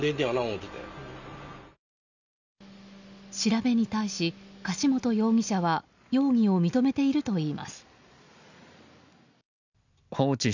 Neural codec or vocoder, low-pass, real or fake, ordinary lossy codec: none; 7.2 kHz; real; none